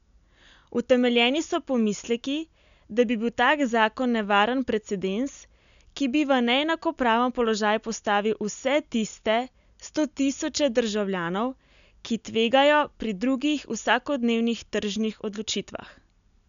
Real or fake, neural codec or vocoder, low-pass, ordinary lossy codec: real; none; 7.2 kHz; none